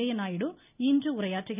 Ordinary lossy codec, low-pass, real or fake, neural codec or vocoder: none; 3.6 kHz; real; none